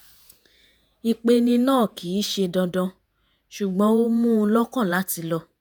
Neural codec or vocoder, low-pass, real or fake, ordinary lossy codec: vocoder, 48 kHz, 128 mel bands, Vocos; none; fake; none